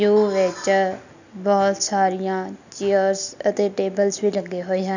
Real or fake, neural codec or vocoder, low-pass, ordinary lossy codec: real; none; 7.2 kHz; none